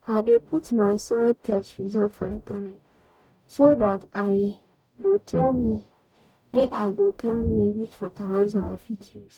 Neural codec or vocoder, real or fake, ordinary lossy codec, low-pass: codec, 44.1 kHz, 0.9 kbps, DAC; fake; none; 19.8 kHz